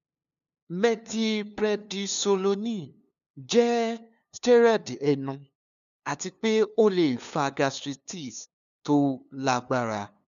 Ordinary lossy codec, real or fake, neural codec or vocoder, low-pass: none; fake; codec, 16 kHz, 2 kbps, FunCodec, trained on LibriTTS, 25 frames a second; 7.2 kHz